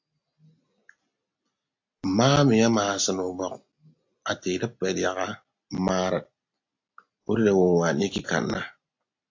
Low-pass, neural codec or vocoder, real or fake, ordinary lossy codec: 7.2 kHz; none; real; AAC, 48 kbps